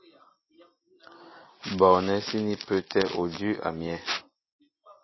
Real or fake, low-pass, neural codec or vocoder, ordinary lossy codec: real; 7.2 kHz; none; MP3, 24 kbps